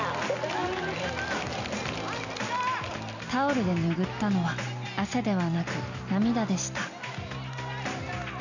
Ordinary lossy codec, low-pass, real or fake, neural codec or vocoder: none; 7.2 kHz; real; none